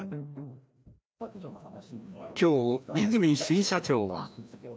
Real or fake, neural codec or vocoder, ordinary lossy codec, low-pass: fake; codec, 16 kHz, 1 kbps, FreqCodec, larger model; none; none